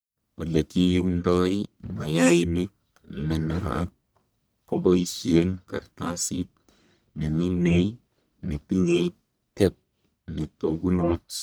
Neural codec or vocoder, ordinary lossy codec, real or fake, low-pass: codec, 44.1 kHz, 1.7 kbps, Pupu-Codec; none; fake; none